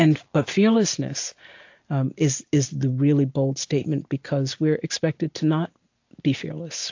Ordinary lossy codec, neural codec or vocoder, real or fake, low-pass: AAC, 48 kbps; none; real; 7.2 kHz